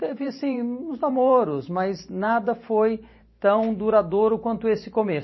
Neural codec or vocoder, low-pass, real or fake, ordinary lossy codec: vocoder, 44.1 kHz, 128 mel bands every 512 samples, BigVGAN v2; 7.2 kHz; fake; MP3, 24 kbps